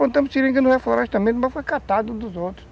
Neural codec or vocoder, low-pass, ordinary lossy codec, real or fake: none; none; none; real